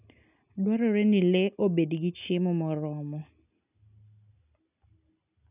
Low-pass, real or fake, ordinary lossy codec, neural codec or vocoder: 3.6 kHz; real; none; none